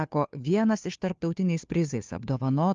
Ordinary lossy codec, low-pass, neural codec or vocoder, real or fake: Opus, 24 kbps; 7.2 kHz; codec, 16 kHz, 2 kbps, FunCodec, trained on Chinese and English, 25 frames a second; fake